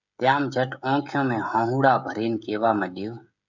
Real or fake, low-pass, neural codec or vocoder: fake; 7.2 kHz; codec, 16 kHz, 16 kbps, FreqCodec, smaller model